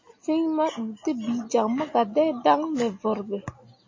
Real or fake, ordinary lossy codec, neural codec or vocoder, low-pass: real; MP3, 32 kbps; none; 7.2 kHz